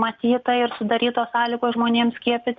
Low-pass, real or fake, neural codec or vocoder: 7.2 kHz; real; none